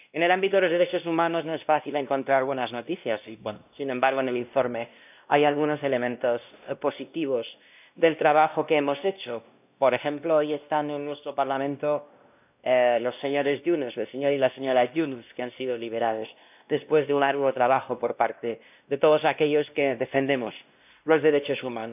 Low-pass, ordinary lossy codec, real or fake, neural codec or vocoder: 3.6 kHz; none; fake; codec, 16 kHz, 1 kbps, X-Codec, WavLM features, trained on Multilingual LibriSpeech